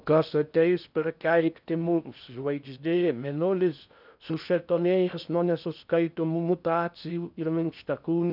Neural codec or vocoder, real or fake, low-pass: codec, 16 kHz in and 24 kHz out, 0.6 kbps, FocalCodec, streaming, 2048 codes; fake; 5.4 kHz